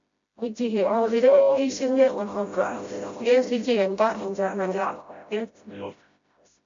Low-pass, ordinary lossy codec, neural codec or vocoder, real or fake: 7.2 kHz; MP3, 48 kbps; codec, 16 kHz, 0.5 kbps, FreqCodec, smaller model; fake